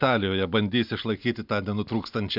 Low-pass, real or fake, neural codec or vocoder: 5.4 kHz; real; none